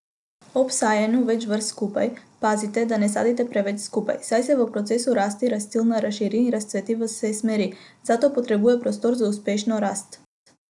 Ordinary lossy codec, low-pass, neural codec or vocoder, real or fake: none; 10.8 kHz; none; real